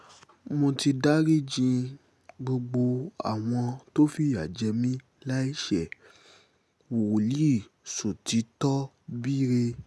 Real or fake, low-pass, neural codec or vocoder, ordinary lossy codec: real; none; none; none